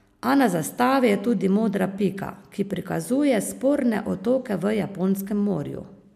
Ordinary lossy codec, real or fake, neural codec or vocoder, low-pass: MP3, 96 kbps; real; none; 14.4 kHz